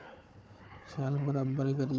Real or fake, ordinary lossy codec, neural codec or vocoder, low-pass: fake; none; codec, 16 kHz, 4 kbps, FunCodec, trained on Chinese and English, 50 frames a second; none